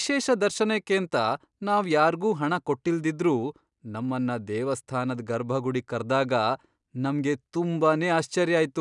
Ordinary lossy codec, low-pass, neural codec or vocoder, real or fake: none; 10.8 kHz; none; real